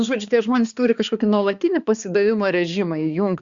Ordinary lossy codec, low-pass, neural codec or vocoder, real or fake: Opus, 64 kbps; 7.2 kHz; codec, 16 kHz, 2 kbps, X-Codec, HuBERT features, trained on balanced general audio; fake